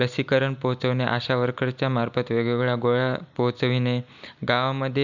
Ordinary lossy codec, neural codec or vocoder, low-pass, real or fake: none; none; 7.2 kHz; real